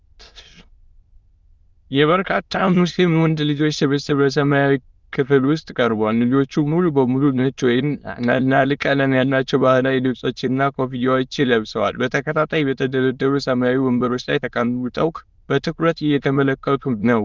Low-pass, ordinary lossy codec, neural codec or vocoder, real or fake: 7.2 kHz; Opus, 32 kbps; autoencoder, 22.05 kHz, a latent of 192 numbers a frame, VITS, trained on many speakers; fake